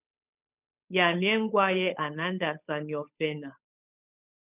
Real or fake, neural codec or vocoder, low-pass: fake; codec, 16 kHz, 8 kbps, FunCodec, trained on Chinese and English, 25 frames a second; 3.6 kHz